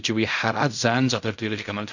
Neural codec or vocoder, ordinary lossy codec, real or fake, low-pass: codec, 16 kHz in and 24 kHz out, 0.4 kbps, LongCat-Audio-Codec, fine tuned four codebook decoder; none; fake; 7.2 kHz